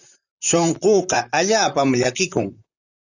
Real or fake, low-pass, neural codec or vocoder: fake; 7.2 kHz; vocoder, 44.1 kHz, 128 mel bands, Pupu-Vocoder